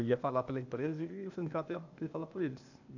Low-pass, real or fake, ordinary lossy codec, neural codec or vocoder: 7.2 kHz; fake; none; codec, 16 kHz, 0.8 kbps, ZipCodec